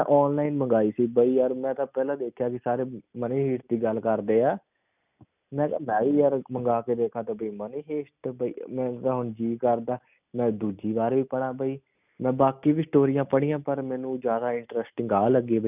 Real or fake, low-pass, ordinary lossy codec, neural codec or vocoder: real; 3.6 kHz; none; none